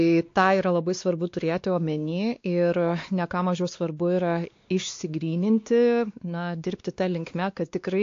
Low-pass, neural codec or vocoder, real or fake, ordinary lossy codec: 7.2 kHz; codec, 16 kHz, 2 kbps, X-Codec, WavLM features, trained on Multilingual LibriSpeech; fake; AAC, 48 kbps